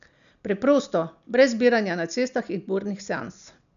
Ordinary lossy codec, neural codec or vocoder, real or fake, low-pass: none; none; real; 7.2 kHz